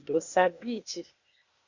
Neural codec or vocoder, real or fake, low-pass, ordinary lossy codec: codec, 16 kHz, 0.8 kbps, ZipCodec; fake; 7.2 kHz; Opus, 64 kbps